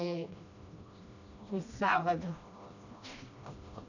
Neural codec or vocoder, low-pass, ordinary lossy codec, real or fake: codec, 16 kHz, 1 kbps, FreqCodec, smaller model; 7.2 kHz; none; fake